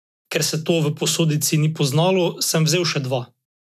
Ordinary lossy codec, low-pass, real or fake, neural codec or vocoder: none; 14.4 kHz; real; none